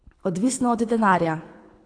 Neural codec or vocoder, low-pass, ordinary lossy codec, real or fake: codec, 24 kHz, 6 kbps, HILCodec; 9.9 kHz; AAC, 48 kbps; fake